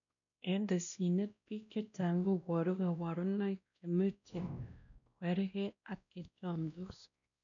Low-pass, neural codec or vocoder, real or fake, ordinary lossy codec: 7.2 kHz; codec, 16 kHz, 1 kbps, X-Codec, WavLM features, trained on Multilingual LibriSpeech; fake; none